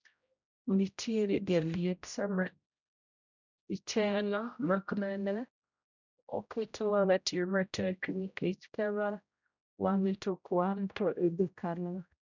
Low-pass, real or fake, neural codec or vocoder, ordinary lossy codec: 7.2 kHz; fake; codec, 16 kHz, 0.5 kbps, X-Codec, HuBERT features, trained on general audio; none